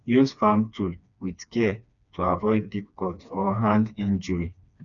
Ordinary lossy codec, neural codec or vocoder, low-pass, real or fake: none; codec, 16 kHz, 2 kbps, FreqCodec, smaller model; 7.2 kHz; fake